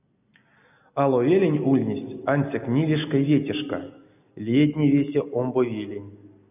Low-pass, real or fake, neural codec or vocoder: 3.6 kHz; real; none